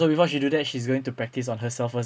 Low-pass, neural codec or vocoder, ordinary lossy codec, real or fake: none; none; none; real